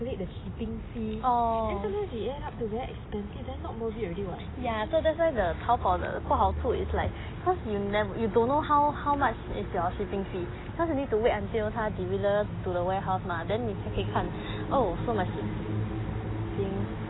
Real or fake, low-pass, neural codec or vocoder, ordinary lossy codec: real; 7.2 kHz; none; AAC, 16 kbps